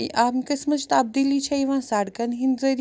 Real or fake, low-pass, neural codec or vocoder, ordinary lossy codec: real; none; none; none